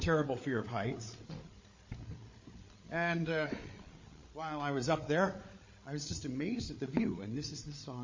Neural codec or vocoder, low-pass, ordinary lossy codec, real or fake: codec, 16 kHz, 8 kbps, FreqCodec, larger model; 7.2 kHz; MP3, 32 kbps; fake